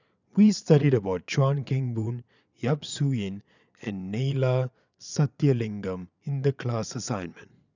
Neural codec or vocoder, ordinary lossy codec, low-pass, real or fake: vocoder, 22.05 kHz, 80 mel bands, Vocos; none; 7.2 kHz; fake